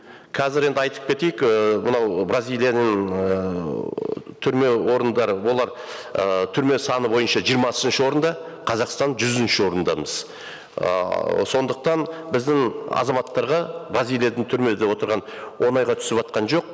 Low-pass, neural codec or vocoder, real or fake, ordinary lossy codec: none; none; real; none